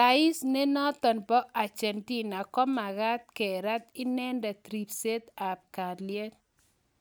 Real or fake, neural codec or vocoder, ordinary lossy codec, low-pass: real; none; none; none